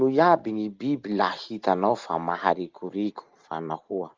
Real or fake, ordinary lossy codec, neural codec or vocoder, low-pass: real; Opus, 32 kbps; none; 7.2 kHz